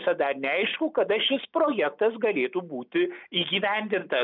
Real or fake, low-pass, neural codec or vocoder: real; 5.4 kHz; none